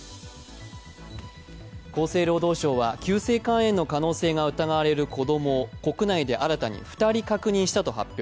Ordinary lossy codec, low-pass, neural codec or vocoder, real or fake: none; none; none; real